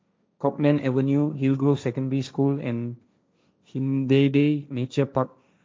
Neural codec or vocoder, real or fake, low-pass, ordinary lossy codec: codec, 16 kHz, 1.1 kbps, Voila-Tokenizer; fake; none; none